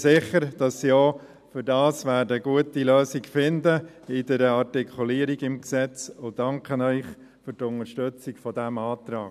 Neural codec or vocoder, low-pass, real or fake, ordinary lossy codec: none; 14.4 kHz; real; none